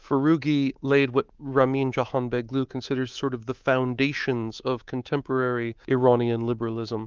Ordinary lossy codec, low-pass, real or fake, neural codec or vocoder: Opus, 32 kbps; 7.2 kHz; fake; codec, 24 kHz, 3.1 kbps, DualCodec